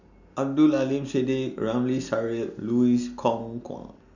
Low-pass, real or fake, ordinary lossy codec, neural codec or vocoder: 7.2 kHz; real; none; none